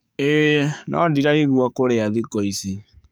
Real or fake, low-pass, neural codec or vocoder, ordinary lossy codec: fake; none; codec, 44.1 kHz, 7.8 kbps, DAC; none